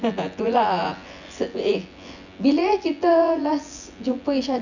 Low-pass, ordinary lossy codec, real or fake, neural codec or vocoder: 7.2 kHz; none; fake; vocoder, 24 kHz, 100 mel bands, Vocos